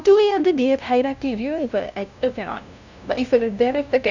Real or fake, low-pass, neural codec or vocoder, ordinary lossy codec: fake; 7.2 kHz; codec, 16 kHz, 0.5 kbps, FunCodec, trained on LibriTTS, 25 frames a second; none